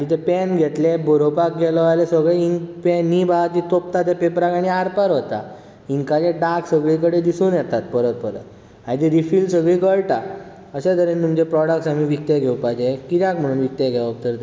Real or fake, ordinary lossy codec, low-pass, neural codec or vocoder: real; none; none; none